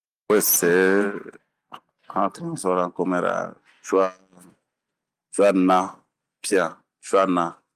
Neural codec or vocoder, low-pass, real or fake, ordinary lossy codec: none; 14.4 kHz; real; Opus, 32 kbps